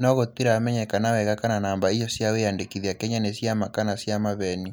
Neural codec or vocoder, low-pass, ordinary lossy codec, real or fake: none; none; none; real